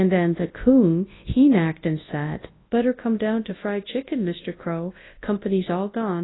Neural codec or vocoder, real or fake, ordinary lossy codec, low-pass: codec, 24 kHz, 0.9 kbps, WavTokenizer, large speech release; fake; AAC, 16 kbps; 7.2 kHz